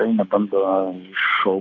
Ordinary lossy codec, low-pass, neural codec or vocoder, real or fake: AAC, 32 kbps; 7.2 kHz; codec, 24 kHz, 6 kbps, HILCodec; fake